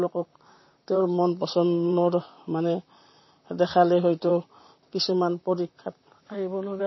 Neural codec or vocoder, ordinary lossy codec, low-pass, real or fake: vocoder, 44.1 kHz, 128 mel bands, Pupu-Vocoder; MP3, 24 kbps; 7.2 kHz; fake